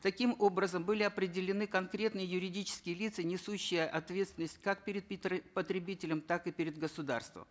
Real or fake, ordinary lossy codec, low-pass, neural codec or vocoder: real; none; none; none